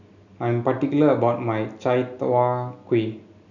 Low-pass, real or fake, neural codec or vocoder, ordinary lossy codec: 7.2 kHz; real; none; none